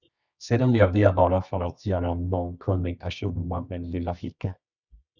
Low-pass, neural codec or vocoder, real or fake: 7.2 kHz; codec, 24 kHz, 0.9 kbps, WavTokenizer, medium music audio release; fake